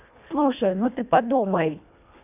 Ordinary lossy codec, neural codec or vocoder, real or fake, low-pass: none; codec, 24 kHz, 1.5 kbps, HILCodec; fake; 3.6 kHz